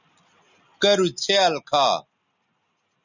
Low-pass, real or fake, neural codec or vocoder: 7.2 kHz; real; none